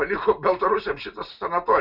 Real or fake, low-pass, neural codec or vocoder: real; 5.4 kHz; none